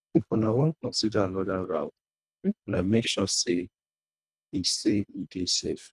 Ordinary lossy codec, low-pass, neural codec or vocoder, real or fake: none; none; codec, 24 kHz, 1.5 kbps, HILCodec; fake